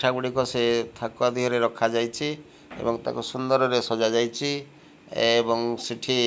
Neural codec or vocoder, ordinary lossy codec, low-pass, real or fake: none; none; none; real